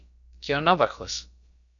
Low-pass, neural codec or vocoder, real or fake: 7.2 kHz; codec, 16 kHz, about 1 kbps, DyCAST, with the encoder's durations; fake